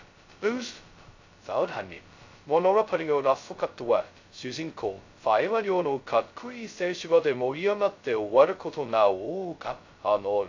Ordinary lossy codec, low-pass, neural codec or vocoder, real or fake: AAC, 48 kbps; 7.2 kHz; codec, 16 kHz, 0.2 kbps, FocalCodec; fake